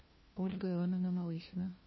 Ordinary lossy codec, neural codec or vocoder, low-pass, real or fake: MP3, 24 kbps; codec, 16 kHz, 1 kbps, FunCodec, trained on LibriTTS, 50 frames a second; 7.2 kHz; fake